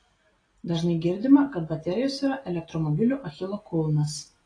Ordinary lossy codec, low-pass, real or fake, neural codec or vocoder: AAC, 32 kbps; 9.9 kHz; real; none